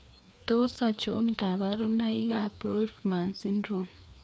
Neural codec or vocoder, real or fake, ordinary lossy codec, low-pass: codec, 16 kHz, 2 kbps, FreqCodec, larger model; fake; none; none